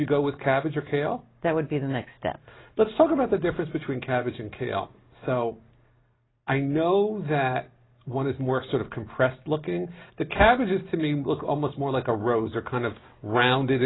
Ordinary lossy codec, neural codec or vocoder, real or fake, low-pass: AAC, 16 kbps; none; real; 7.2 kHz